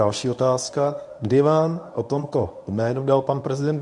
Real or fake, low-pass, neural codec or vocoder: fake; 10.8 kHz; codec, 24 kHz, 0.9 kbps, WavTokenizer, medium speech release version 1